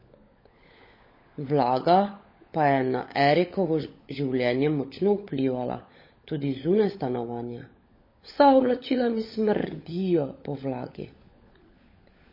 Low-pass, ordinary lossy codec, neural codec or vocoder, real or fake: 5.4 kHz; MP3, 24 kbps; codec, 16 kHz, 16 kbps, FunCodec, trained on LibriTTS, 50 frames a second; fake